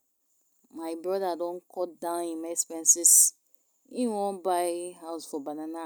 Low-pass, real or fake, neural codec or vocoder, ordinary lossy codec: none; real; none; none